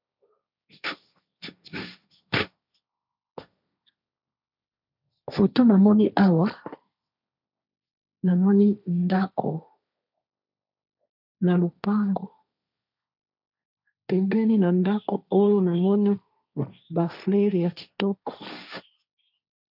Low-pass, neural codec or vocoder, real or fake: 5.4 kHz; codec, 16 kHz, 1.1 kbps, Voila-Tokenizer; fake